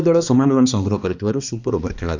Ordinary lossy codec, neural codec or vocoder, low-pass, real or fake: none; codec, 16 kHz, 2 kbps, X-Codec, HuBERT features, trained on balanced general audio; 7.2 kHz; fake